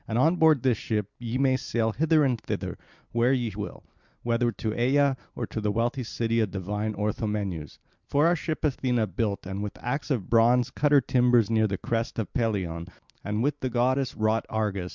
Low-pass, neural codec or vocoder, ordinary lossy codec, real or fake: 7.2 kHz; none; Opus, 64 kbps; real